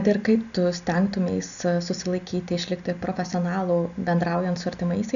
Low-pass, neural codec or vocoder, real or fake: 7.2 kHz; none; real